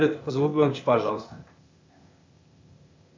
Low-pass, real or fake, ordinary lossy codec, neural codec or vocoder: 7.2 kHz; fake; MP3, 64 kbps; codec, 16 kHz, 0.8 kbps, ZipCodec